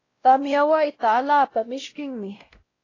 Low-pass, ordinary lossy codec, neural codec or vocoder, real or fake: 7.2 kHz; AAC, 32 kbps; codec, 16 kHz, 0.5 kbps, X-Codec, WavLM features, trained on Multilingual LibriSpeech; fake